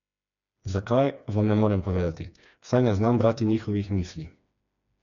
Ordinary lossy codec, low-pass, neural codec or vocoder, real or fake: none; 7.2 kHz; codec, 16 kHz, 2 kbps, FreqCodec, smaller model; fake